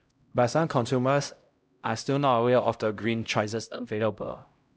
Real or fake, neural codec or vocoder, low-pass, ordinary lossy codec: fake; codec, 16 kHz, 0.5 kbps, X-Codec, HuBERT features, trained on LibriSpeech; none; none